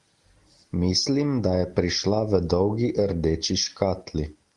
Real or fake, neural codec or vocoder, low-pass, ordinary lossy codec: real; none; 10.8 kHz; Opus, 24 kbps